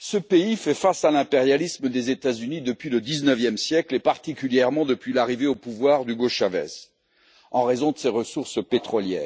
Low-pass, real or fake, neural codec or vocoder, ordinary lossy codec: none; real; none; none